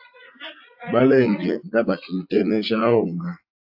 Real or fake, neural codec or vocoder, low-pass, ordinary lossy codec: fake; vocoder, 44.1 kHz, 80 mel bands, Vocos; 5.4 kHz; AAC, 48 kbps